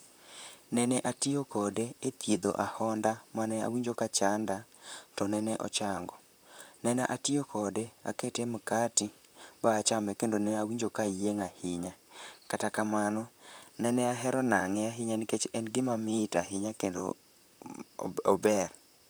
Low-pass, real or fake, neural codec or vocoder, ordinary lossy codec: none; fake; vocoder, 44.1 kHz, 128 mel bands, Pupu-Vocoder; none